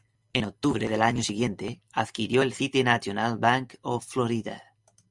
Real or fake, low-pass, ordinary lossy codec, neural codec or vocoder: real; 10.8 kHz; Opus, 64 kbps; none